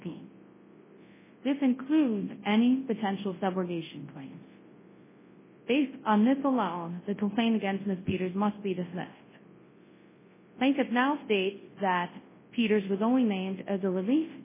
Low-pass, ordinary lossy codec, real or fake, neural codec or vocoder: 3.6 kHz; MP3, 16 kbps; fake; codec, 24 kHz, 0.9 kbps, WavTokenizer, large speech release